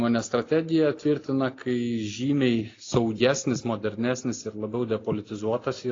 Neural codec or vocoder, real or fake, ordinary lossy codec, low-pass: none; real; AAC, 32 kbps; 7.2 kHz